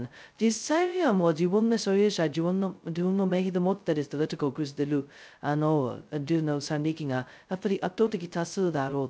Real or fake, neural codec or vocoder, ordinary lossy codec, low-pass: fake; codec, 16 kHz, 0.2 kbps, FocalCodec; none; none